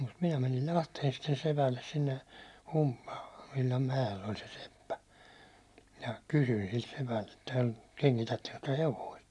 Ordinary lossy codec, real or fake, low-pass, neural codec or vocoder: none; real; none; none